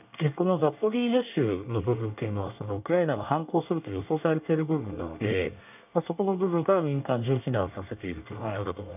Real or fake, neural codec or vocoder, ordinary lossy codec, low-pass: fake; codec, 24 kHz, 1 kbps, SNAC; none; 3.6 kHz